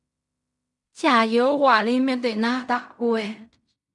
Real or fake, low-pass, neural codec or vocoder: fake; 10.8 kHz; codec, 16 kHz in and 24 kHz out, 0.4 kbps, LongCat-Audio-Codec, fine tuned four codebook decoder